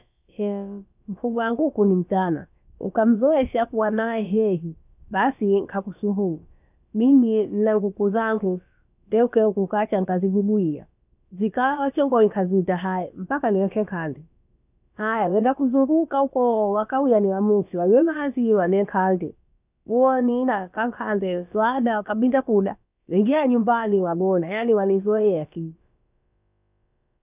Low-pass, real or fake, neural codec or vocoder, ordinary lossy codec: 3.6 kHz; fake; codec, 16 kHz, about 1 kbps, DyCAST, with the encoder's durations; none